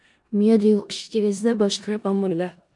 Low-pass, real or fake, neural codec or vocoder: 10.8 kHz; fake; codec, 16 kHz in and 24 kHz out, 0.4 kbps, LongCat-Audio-Codec, four codebook decoder